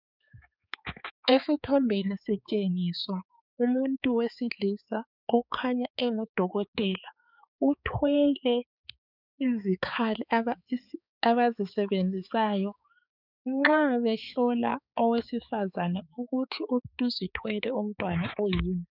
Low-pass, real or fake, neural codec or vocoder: 5.4 kHz; fake; codec, 16 kHz, 4 kbps, X-Codec, HuBERT features, trained on balanced general audio